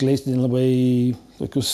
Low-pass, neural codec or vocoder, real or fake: 14.4 kHz; none; real